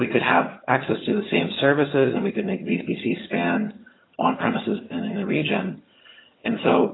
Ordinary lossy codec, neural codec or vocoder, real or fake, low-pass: AAC, 16 kbps; vocoder, 22.05 kHz, 80 mel bands, HiFi-GAN; fake; 7.2 kHz